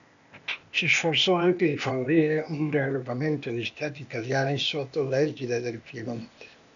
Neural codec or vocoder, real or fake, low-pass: codec, 16 kHz, 0.8 kbps, ZipCodec; fake; 7.2 kHz